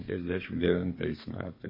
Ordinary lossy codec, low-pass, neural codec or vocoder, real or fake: MP3, 24 kbps; 5.4 kHz; codec, 32 kHz, 1.9 kbps, SNAC; fake